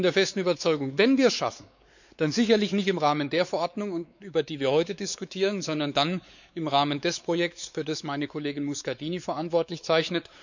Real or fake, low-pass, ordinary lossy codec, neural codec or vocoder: fake; 7.2 kHz; none; codec, 16 kHz, 4 kbps, X-Codec, WavLM features, trained on Multilingual LibriSpeech